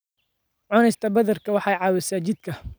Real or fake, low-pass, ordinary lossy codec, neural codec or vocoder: real; none; none; none